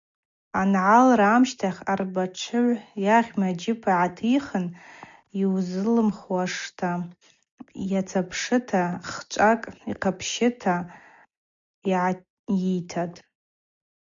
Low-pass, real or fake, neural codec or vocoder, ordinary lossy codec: 7.2 kHz; real; none; MP3, 64 kbps